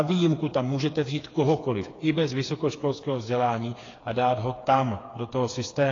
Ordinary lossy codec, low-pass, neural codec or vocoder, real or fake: AAC, 32 kbps; 7.2 kHz; codec, 16 kHz, 4 kbps, FreqCodec, smaller model; fake